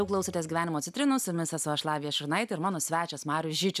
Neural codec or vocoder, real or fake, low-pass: none; real; 14.4 kHz